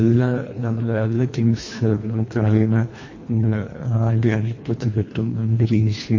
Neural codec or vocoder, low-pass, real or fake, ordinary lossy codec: codec, 24 kHz, 1.5 kbps, HILCodec; 7.2 kHz; fake; MP3, 32 kbps